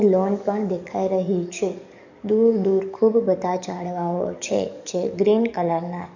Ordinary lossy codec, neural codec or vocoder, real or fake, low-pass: none; codec, 44.1 kHz, 7.8 kbps, DAC; fake; 7.2 kHz